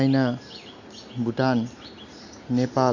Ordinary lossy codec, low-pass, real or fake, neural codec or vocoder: none; 7.2 kHz; real; none